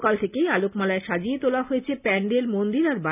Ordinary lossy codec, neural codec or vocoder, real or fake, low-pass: none; none; real; 3.6 kHz